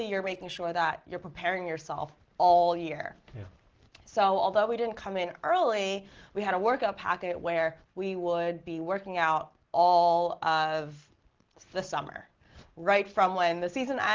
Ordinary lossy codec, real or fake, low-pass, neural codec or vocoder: Opus, 16 kbps; real; 7.2 kHz; none